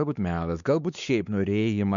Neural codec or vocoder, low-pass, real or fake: codec, 16 kHz, 4 kbps, X-Codec, WavLM features, trained on Multilingual LibriSpeech; 7.2 kHz; fake